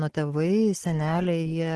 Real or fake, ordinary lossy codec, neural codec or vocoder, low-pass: real; Opus, 16 kbps; none; 10.8 kHz